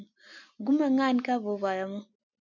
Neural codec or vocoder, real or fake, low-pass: none; real; 7.2 kHz